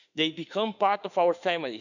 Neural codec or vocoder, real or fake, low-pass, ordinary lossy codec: autoencoder, 48 kHz, 32 numbers a frame, DAC-VAE, trained on Japanese speech; fake; 7.2 kHz; none